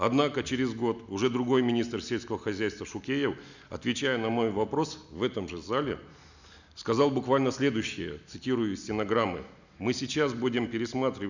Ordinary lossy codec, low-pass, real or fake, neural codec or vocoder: none; 7.2 kHz; real; none